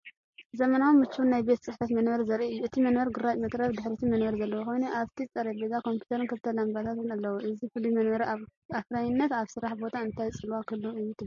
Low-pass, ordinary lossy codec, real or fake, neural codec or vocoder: 7.2 kHz; MP3, 32 kbps; real; none